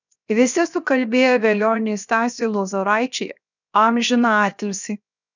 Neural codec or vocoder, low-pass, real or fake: codec, 16 kHz, 0.7 kbps, FocalCodec; 7.2 kHz; fake